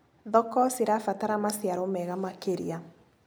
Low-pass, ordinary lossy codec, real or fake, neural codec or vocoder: none; none; real; none